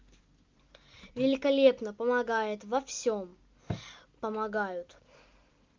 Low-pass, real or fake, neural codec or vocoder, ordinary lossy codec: 7.2 kHz; real; none; Opus, 24 kbps